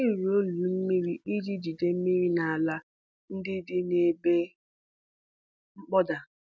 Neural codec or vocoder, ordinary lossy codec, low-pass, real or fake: none; none; none; real